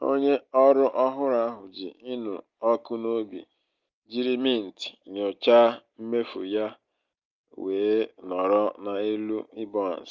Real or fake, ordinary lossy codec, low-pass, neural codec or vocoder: real; Opus, 32 kbps; 7.2 kHz; none